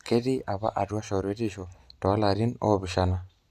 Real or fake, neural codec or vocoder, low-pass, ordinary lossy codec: real; none; 14.4 kHz; none